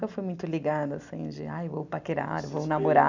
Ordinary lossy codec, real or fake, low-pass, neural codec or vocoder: none; real; 7.2 kHz; none